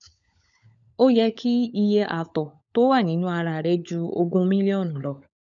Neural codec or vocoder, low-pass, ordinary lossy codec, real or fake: codec, 16 kHz, 16 kbps, FunCodec, trained on LibriTTS, 50 frames a second; 7.2 kHz; none; fake